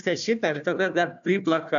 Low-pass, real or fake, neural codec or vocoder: 7.2 kHz; fake; codec, 16 kHz, 1 kbps, FunCodec, trained on Chinese and English, 50 frames a second